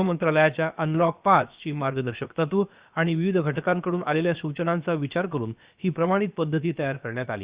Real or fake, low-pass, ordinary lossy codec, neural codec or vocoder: fake; 3.6 kHz; Opus, 64 kbps; codec, 16 kHz, about 1 kbps, DyCAST, with the encoder's durations